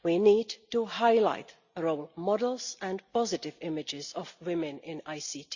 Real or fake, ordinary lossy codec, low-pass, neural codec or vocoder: real; Opus, 64 kbps; 7.2 kHz; none